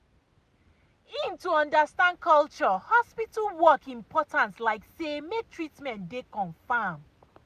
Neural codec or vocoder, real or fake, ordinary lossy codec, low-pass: none; real; none; 14.4 kHz